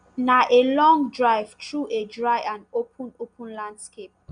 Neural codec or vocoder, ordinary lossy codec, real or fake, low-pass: none; none; real; 9.9 kHz